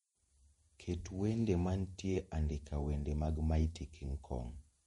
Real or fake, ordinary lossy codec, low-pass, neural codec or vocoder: fake; MP3, 48 kbps; 19.8 kHz; vocoder, 48 kHz, 128 mel bands, Vocos